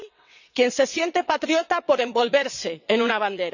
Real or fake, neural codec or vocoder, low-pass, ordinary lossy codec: fake; vocoder, 22.05 kHz, 80 mel bands, WaveNeXt; 7.2 kHz; none